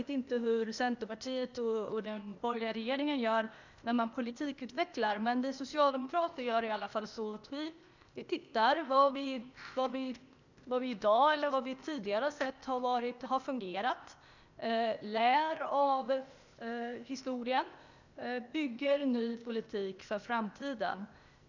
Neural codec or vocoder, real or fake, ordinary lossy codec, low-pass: codec, 16 kHz, 0.8 kbps, ZipCodec; fake; none; 7.2 kHz